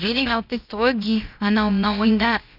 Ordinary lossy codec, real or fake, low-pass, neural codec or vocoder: none; fake; 5.4 kHz; codec, 16 kHz, about 1 kbps, DyCAST, with the encoder's durations